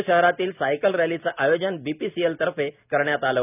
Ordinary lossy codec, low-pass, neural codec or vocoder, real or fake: none; 3.6 kHz; none; real